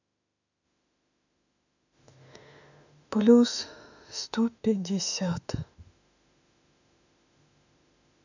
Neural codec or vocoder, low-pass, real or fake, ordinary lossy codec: autoencoder, 48 kHz, 32 numbers a frame, DAC-VAE, trained on Japanese speech; 7.2 kHz; fake; none